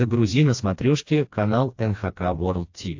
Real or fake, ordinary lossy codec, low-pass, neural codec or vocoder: fake; AAC, 48 kbps; 7.2 kHz; codec, 16 kHz, 2 kbps, FreqCodec, smaller model